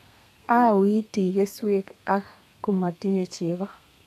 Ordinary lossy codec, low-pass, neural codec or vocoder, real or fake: none; 14.4 kHz; codec, 32 kHz, 1.9 kbps, SNAC; fake